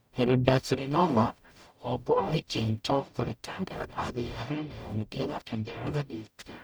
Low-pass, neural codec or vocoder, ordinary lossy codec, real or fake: none; codec, 44.1 kHz, 0.9 kbps, DAC; none; fake